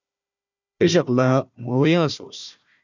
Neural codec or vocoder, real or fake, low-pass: codec, 16 kHz, 1 kbps, FunCodec, trained on Chinese and English, 50 frames a second; fake; 7.2 kHz